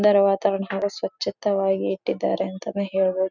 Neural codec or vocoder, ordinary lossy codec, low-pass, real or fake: none; none; 7.2 kHz; real